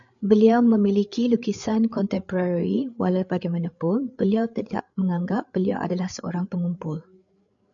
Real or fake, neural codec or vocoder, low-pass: fake; codec, 16 kHz, 8 kbps, FreqCodec, larger model; 7.2 kHz